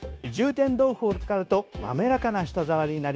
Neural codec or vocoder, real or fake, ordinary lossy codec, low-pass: codec, 16 kHz, 0.9 kbps, LongCat-Audio-Codec; fake; none; none